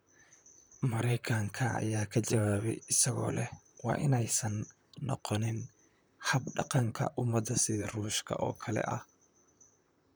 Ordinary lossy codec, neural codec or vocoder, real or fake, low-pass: none; vocoder, 44.1 kHz, 128 mel bands, Pupu-Vocoder; fake; none